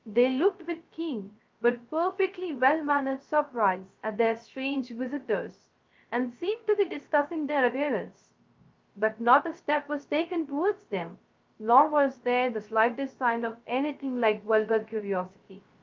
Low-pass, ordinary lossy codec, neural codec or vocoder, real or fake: 7.2 kHz; Opus, 32 kbps; codec, 16 kHz, 0.3 kbps, FocalCodec; fake